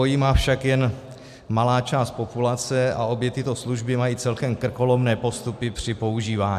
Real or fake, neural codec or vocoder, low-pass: real; none; 14.4 kHz